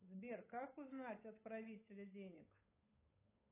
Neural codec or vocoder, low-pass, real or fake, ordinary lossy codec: codec, 16 kHz, 8 kbps, FunCodec, trained on Chinese and English, 25 frames a second; 3.6 kHz; fake; AAC, 24 kbps